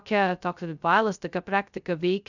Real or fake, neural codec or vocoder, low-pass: fake; codec, 16 kHz, 0.2 kbps, FocalCodec; 7.2 kHz